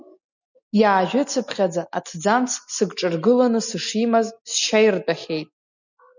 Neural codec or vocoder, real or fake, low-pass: none; real; 7.2 kHz